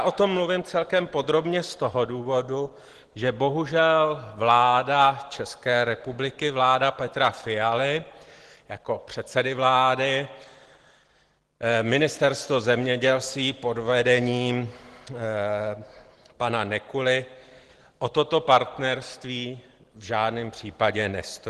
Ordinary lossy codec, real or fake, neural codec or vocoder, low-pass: Opus, 16 kbps; real; none; 10.8 kHz